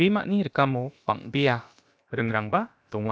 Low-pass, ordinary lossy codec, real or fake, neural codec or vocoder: none; none; fake; codec, 16 kHz, about 1 kbps, DyCAST, with the encoder's durations